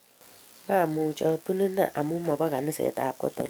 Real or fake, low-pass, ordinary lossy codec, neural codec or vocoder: fake; none; none; codec, 44.1 kHz, 7.8 kbps, DAC